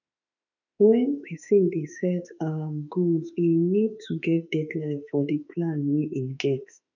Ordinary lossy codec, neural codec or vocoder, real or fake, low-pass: none; autoencoder, 48 kHz, 32 numbers a frame, DAC-VAE, trained on Japanese speech; fake; 7.2 kHz